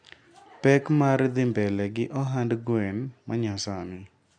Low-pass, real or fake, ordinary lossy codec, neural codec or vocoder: 9.9 kHz; real; none; none